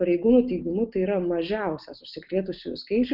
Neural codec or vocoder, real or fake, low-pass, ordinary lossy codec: none; real; 5.4 kHz; Opus, 24 kbps